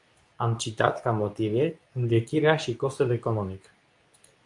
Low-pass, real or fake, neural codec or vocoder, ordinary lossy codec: 10.8 kHz; fake; codec, 24 kHz, 0.9 kbps, WavTokenizer, medium speech release version 2; MP3, 64 kbps